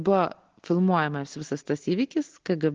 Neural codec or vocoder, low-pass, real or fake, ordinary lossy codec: none; 7.2 kHz; real; Opus, 16 kbps